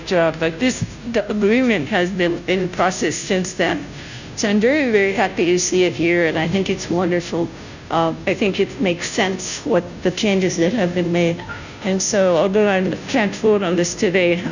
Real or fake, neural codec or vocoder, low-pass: fake; codec, 16 kHz, 0.5 kbps, FunCodec, trained on Chinese and English, 25 frames a second; 7.2 kHz